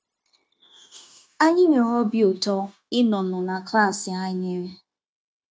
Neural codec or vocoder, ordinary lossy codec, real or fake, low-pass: codec, 16 kHz, 0.9 kbps, LongCat-Audio-Codec; none; fake; none